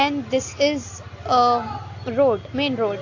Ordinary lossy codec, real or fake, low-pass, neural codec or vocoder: AAC, 48 kbps; real; 7.2 kHz; none